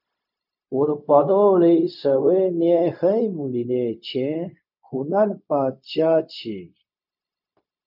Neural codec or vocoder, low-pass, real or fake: codec, 16 kHz, 0.4 kbps, LongCat-Audio-Codec; 5.4 kHz; fake